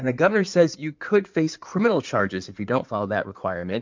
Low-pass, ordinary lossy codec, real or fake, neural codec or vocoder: 7.2 kHz; MP3, 64 kbps; fake; codec, 16 kHz in and 24 kHz out, 2.2 kbps, FireRedTTS-2 codec